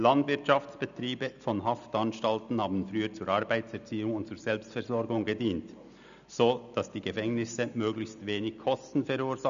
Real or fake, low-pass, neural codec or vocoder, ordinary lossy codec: real; 7.2 kHz; none; none